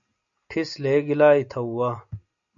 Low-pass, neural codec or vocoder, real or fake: 7.2 kHz; none; real